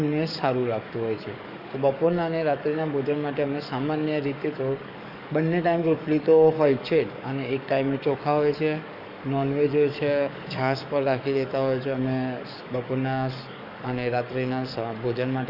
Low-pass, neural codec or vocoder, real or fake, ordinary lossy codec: 5.4 kHz; codec, 44.1 kHz, 7.8 kbps, DAC; fake; none